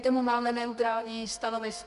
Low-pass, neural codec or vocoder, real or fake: 10.8 kHz; codec, 24 kHz, 0.9 kbps, WavTokenizer, medium music audio release; fake